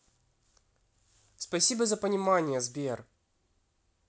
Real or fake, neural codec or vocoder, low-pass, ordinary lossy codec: real; none; none; none